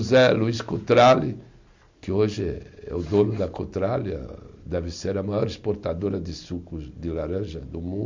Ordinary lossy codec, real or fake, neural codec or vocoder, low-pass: MP3, 64 kbps; real; none; 7.2 kHz